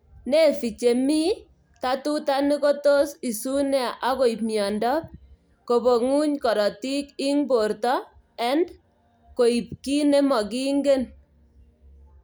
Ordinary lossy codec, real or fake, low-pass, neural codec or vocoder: none; real; none; none